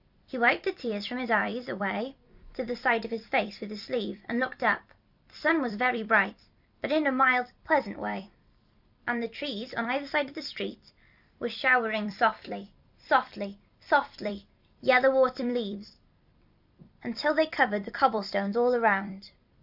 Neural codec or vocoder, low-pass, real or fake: vocoder, 44.1 kHz, 128 mel bands every 256 samples, BigVGAN v2; 5.4 kHz; fake